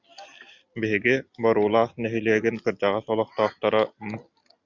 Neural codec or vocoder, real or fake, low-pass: none; real; 7.2 kHz